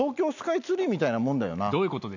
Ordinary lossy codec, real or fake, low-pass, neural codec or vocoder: none; real; 7.2 kHz; none